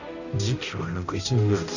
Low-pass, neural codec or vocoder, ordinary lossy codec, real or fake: 7.2 kHz; codec, 16 kHz, 0.5 kbps, X-Codec, HuBERT features, trained on balanced general audio; none; fake